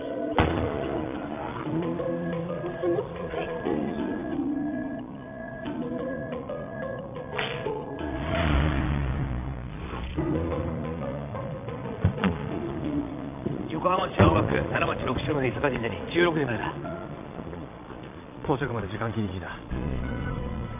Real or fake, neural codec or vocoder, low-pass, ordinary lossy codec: fake; vocoder, 22.05 kHz, 80 mel bands, Vocos; 3.6 kHz; AAC, 32 kbps